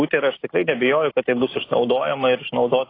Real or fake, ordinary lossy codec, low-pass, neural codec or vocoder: real; AAC, 24 kbps; 5.4 kHz; none